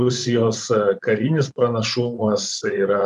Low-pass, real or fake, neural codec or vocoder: 10.8 kHz; fake; vocoder, 24 kHz, 100 mel bands, Vocos